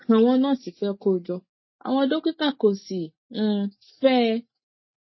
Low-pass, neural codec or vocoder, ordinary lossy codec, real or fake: 7.2 kHz; none; MP3, 24 kbps; real